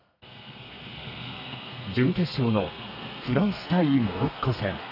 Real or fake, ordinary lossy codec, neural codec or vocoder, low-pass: fake; none; codec, 32 kHz, 1.9 kbps, SNAC; 5.4 kHz